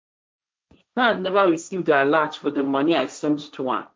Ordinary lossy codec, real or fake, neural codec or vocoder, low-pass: none; fake; codec, 16 kHz, 1.1 kbps, Voila-Tokenizer; none